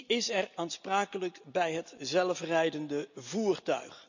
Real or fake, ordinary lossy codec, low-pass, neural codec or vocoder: real; none; 7.2 kHz; none